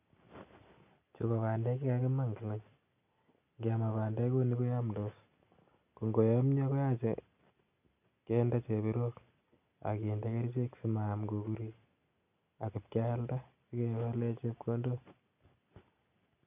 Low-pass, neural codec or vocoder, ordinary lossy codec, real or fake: 3.6 kHz; none; none; real